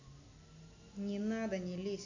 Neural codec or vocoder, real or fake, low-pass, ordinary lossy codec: none; real; 7.2 kHz; AAC, 48 kbps